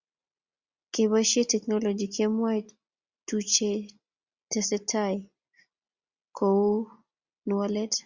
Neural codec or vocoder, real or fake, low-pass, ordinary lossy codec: none; real; 7.2 kHz; Opus, 64 kbps